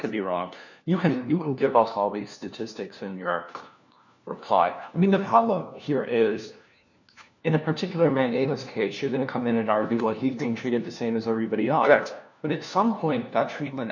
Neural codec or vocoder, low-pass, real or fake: codec, 16 kHz, 1 kbps, FunCodec, trained on LibriTTS, 50 frames a second; 7.2 kHz; fake